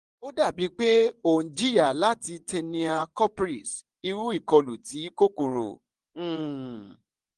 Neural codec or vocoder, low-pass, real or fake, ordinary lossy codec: vocoder, 24 kHz, 100 mel bands, Vocos; 10.8 kHz; fake; Opus, 24 kbps